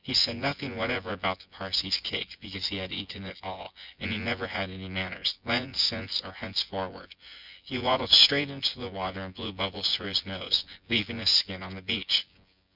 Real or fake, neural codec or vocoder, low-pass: fake; vocoder, 24 kHz, 100 mel bands, Vocos; 5.4 kHz